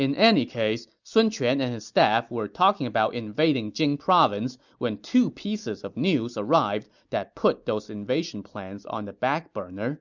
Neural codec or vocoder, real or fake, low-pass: none; real; 7.2 kHz